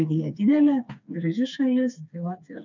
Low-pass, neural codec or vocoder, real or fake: 7.2 kHz; codec, 16 kHz, 4 kbps, FreqCodec, smaller model; fake